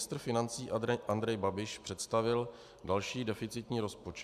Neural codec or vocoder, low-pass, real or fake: none; 14.4 kHz; real